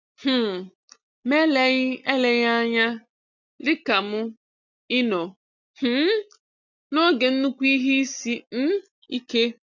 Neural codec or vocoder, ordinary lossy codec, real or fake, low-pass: none; none; real; 7.2 kHz